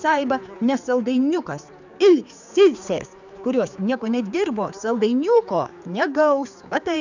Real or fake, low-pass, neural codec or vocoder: fake; 7.2 kHz; codec, 16 kHz, 4 kbps, X-Codec, HuBERT features, trained on general audio